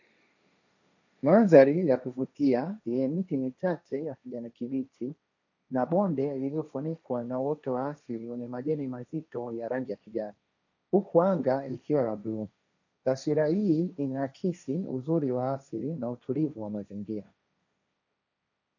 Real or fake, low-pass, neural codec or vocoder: fake; 7.2 kHz; codec, 16 kHz, 1.1 kbps, Voila-Tokenizer